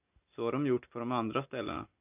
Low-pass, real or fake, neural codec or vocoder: 3.6 kHz; real; none